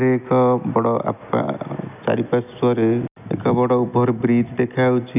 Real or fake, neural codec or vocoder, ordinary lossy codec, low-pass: real; none; none; 3.6 kHz